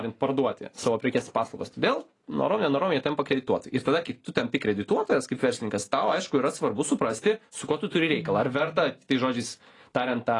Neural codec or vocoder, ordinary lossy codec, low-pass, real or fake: none; AAC, 32 kbps; 10.8 kHz; real